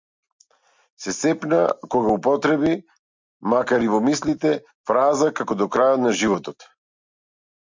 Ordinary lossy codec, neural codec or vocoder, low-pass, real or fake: MP3, 64 kbps; none; 7.2 kHz; real